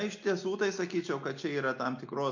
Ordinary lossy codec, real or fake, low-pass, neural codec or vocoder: MP3, 48 kbps; real; 7.2 kHz; none